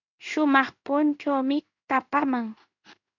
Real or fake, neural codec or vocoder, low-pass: fake; codec, 16 kHz in and 24 kHz out, 1 kbps, XY-Tokenizer; 7.2 kHz